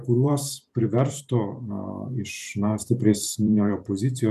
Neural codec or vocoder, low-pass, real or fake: vocoder, 44.1 kHz, 128 mel bands every 512 samples, BigVGAN v2; 14.4 kHz; fake